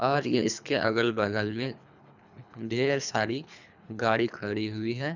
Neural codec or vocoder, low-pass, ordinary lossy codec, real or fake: codec, 24 kHz, 3 kbps, HILCodec; 7.2 kHz; none; fake